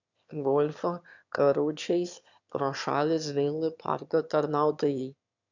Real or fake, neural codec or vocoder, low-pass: fake; autoencoder, 22.05 kHz, a latent of 192 numbers a frame, VITS, trained on one speaker; 7.2 kHz